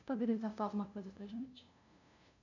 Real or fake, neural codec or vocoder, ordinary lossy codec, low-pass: fake; codec, 16 kHz, 0.5 kbps, FunCodec, trained on LibriTTS, 25 frames a second; none; 7.2 kHz